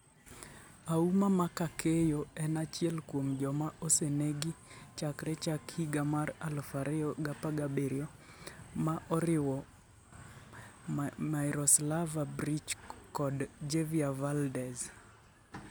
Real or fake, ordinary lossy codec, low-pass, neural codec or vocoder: real; none; none; none